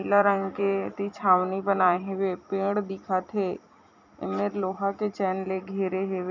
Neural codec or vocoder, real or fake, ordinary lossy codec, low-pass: vocoder, 44.1 kHz, 128 mel bands every 256 samples, BigVGAN v2; fake; none; 7.2 kHz